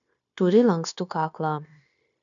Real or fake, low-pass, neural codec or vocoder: fake; 7.2 kHz; codec, 16 kHz, 0.9 kbps, LongCat-Audio-Codec